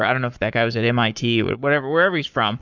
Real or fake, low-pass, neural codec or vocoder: fake; 7.2 kHz; codec, 44.1 kHz, 7.8 kbps, Pupu-Codec